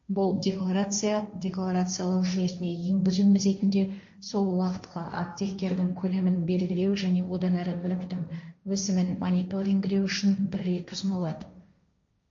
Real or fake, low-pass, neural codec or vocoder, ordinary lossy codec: fake; 7.2 kHz; codec, 16 kHz, 1.1 kbps, Voila-Tokenizer; MP3, 48 kbps